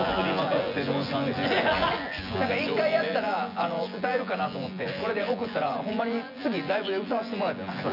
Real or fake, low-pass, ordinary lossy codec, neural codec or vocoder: fake; 5.4 kHz; none; vocoder, 24 kHz, 100 mel bands, Vocos